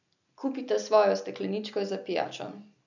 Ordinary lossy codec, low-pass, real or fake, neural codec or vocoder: none; 7.2 kHz; real; none